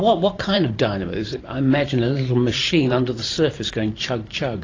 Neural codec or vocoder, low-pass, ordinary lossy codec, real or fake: vocoder, 44.1 kHz, 128 mel bands every 512 samples, BigVGAN v2; 7.2 kHz; AAC, 32 kbps; fake